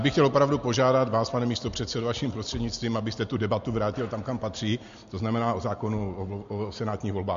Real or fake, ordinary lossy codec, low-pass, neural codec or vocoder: real; MP3, 48 kbps; 7.2 kHz; none